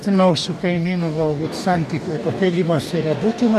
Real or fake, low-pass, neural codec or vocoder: fake; 14.4 kHz; codec, 44.1 kHz, 2.6 kbps, DAC